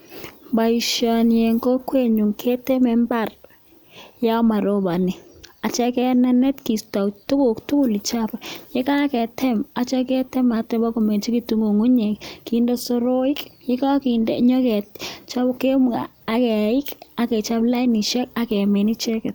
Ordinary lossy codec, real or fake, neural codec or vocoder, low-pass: none; real; none; none